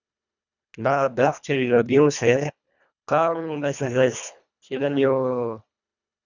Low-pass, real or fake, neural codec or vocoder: 7.2 kHz; fake; codec, 24 kHz, 1.5 kbps, HILCodec